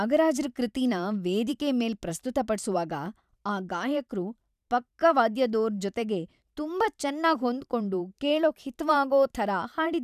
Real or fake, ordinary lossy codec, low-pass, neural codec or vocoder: fake; none; 14.4 kHz; vocoder, 44.1 kHz, 128 mel bands every 512 samples, BigVGAN v2